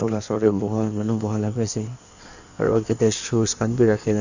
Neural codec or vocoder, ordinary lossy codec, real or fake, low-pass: codec, 16 kHz in and 24 kHz out, 1.1 kbps, FireRedTTS-2 codec; none; fake; 7.2 kHz